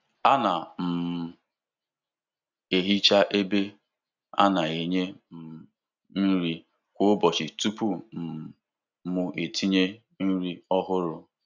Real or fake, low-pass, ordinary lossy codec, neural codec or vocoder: real; 7.2 kHz; none; none